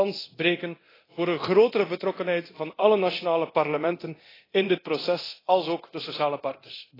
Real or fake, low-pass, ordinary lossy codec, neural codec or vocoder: fake; 5.4 kHz; AAC, 24 kbps; codec, 24 kHz, 0.9 kbps, DualCodec